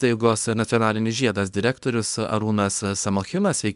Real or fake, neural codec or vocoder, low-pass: fake; codec, 24 kHz, 0.9 kbps, WavTokenizer, small release; 10.8 kHz